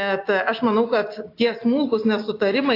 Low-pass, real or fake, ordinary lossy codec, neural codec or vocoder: 5.4 kHz; real; AAC, 32 kbps; none